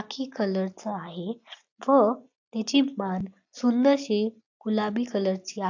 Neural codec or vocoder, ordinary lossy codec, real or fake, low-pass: none; AAC, 48 kbps; real; 7.2 kHz